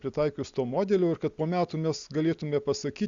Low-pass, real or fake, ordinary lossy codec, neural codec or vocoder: 7.2 kHz; real; Opus, 64 kbps; none